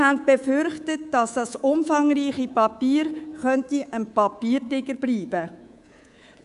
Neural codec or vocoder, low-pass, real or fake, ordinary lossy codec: codec, 24 kHz, 3.1 kbps, DualCodec; 10.8 kHz; fake; none